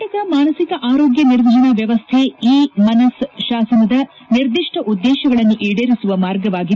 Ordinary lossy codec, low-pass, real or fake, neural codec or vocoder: none; none; real; none